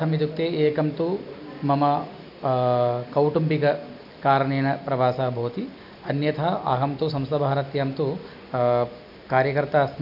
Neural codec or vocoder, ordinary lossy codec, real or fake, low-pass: none; none; real; 5.4 kHz